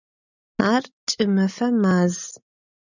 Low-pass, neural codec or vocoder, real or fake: 7.2 kHz; none; real